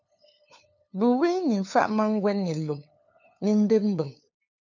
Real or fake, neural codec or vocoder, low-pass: fake; codec, 16 kHz, 2 kbps, FunCodec, trained on LibriTTS, 25 frames a second; 7.2 kHz